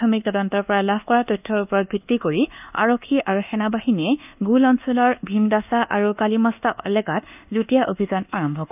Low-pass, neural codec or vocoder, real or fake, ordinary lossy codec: 3.6 kHz; codec, 24 kHz, 1.2 kbps, DualCodec; fake; none